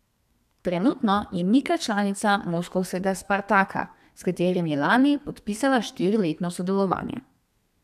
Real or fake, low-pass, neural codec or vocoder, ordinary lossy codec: fake; 14.4 kHz; codec, 32 kHz, 1.9 kbps, SNAC; none